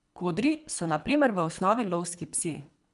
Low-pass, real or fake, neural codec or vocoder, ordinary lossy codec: 10.8 kHz; fake; codec, 24 kHz, 3 kbps, HILCodec; none